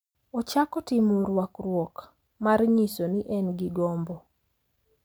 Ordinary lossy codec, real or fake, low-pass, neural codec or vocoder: none; real; none; none